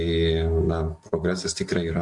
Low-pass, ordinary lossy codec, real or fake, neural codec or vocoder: 10.8 kHz; MP3, 64 kbps; real; none